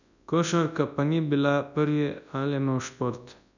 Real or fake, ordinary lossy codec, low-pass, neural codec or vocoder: fake; none; 7.2 kHz; codec, 24 kHz, 0.9 kbps, WavTokenizer, large speech release